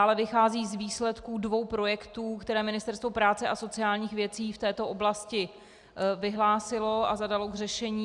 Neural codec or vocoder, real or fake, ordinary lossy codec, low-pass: none; real; Opus, 64 kbps; 10.8 kHz